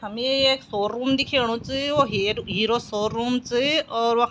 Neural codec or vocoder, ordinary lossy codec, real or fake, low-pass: none; none; real; none